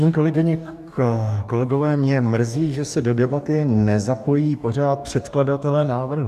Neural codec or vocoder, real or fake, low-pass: codec, 44.1 kHz, 2.6 kbps, DAC; fake; 14.4 kHz